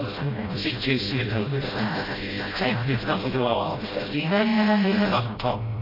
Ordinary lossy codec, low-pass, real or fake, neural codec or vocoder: AAC, 24 kbps; 5.4 kHz; fake; codec, 16 kHz, 0.5 kbps, FreqCodec, smaller model